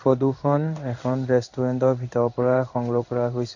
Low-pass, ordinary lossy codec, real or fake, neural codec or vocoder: 7.2 kHz; none; fake; codec, 16 kHz in and 24 kHz out, 1 kbps, XY-Tokenizer